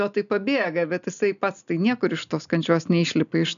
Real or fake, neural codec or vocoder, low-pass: real; none; 7.2 kHz